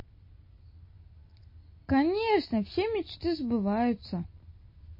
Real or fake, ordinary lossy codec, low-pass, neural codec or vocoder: real; MP3, 24 kbps; 5.4 kHz; none